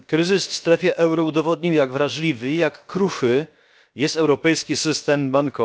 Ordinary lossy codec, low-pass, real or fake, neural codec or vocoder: none; none; fake; codec, 16 kHz, about 1 kbps, DyCAST, with the encoder's durations